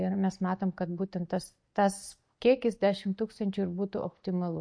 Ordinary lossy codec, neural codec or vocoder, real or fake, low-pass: MP3, 48 kbps; none; real; 9.9 kHz